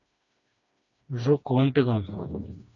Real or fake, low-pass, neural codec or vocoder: fake; 7.2 kHz; codec, 16 kHz, 2 kbps, FreqCodec, smaller model